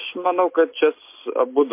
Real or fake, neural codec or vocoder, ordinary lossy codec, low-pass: real; none; MP3, 24 kbps; 3.6 kHz